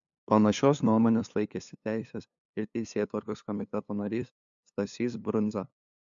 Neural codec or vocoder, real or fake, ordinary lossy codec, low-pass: codec, 16 kHz, 2 kbps, FunCodec, trained on LibriTTS, 25 frames a second; fake; MP3, 64 kbps; 7.2 kHz